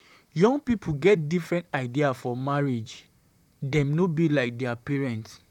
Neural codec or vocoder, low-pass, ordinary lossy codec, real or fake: vocoder, 44.1 kHz, 128 mel bands, Pupu-Vocoder; 19.8 kHz; none; fake